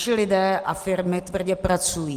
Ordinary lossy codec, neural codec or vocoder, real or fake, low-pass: Opus, 24 kbps; none; real; 14.4 kHz